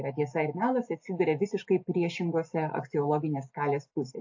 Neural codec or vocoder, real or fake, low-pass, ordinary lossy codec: none; real; 7.2 kHz; AAC, 48 kbps